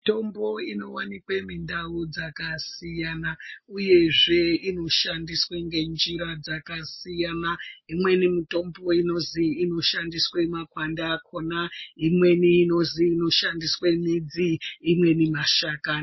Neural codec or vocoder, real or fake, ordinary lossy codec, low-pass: none; real; MP3, 24 kbps; 7.2 kHz